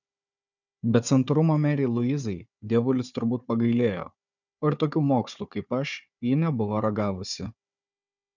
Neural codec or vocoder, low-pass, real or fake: codec, 16 kHz, 4 kbps, FunCodec, trained on Chinese and English, 50 frames a second; 7.2 kHz; fake